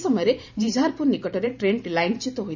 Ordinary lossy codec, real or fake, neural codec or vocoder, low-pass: none; fake; vocoder, 44.1 kHz, 128 mel bands every 512 samples, BigVGAN v2; 7.2 kHz